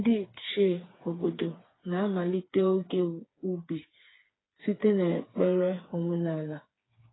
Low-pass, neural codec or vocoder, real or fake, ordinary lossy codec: 7.2 kHz; codec, 16 kHz, 4 kbps, FreqCodec, smaller model; fake; AAC, 16 kbps